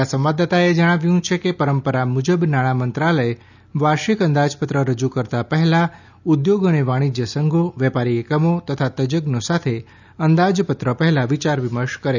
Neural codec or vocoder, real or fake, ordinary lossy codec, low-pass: none; real; none; 7.2 kHz